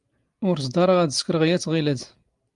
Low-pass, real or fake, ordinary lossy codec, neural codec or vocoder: 10.8 kHz; real; Opus, 32 kbps; none